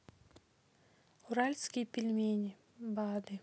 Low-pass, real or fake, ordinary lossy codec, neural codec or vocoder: none; real; none; none